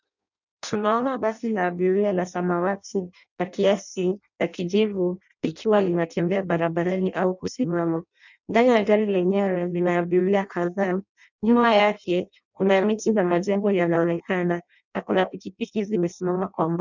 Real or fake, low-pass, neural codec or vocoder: fake; 7.2 kHz; codec, 16 kHz in and 24 kHz out, 0.6 kbps, FireRedTTS-2 codec